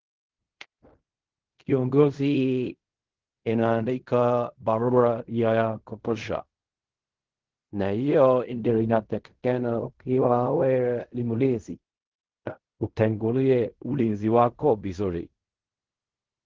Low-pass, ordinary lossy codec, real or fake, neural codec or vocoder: 7.2 kHz; Opus, 16 kbps; fake; codec, 16 kHz in and 24 kHz out, 0.4 kbps, LongCat-Audio-Codec, fine tuned four codebook decoder